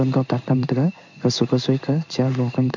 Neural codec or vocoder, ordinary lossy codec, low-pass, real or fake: codec, 16 kHz in and 24 kHz out, 1 kbps, XY-Tokenizer; none; 7.2 kHz; fake